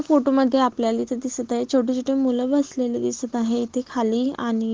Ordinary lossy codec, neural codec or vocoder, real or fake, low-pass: Opus, 16 kbps; none; real; 7.2 kHz